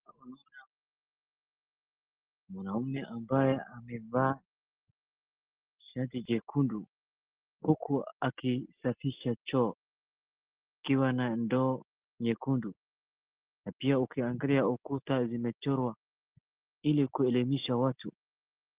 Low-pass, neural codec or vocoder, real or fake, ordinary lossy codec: 3.6 kHz; none; real; Opus, 16 kbps